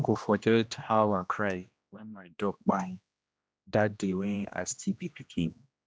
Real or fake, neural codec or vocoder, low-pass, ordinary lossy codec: fake; codec, 16 kHz, 1 kbps, X-Codec, HuBERT features, trained on general audio; none; none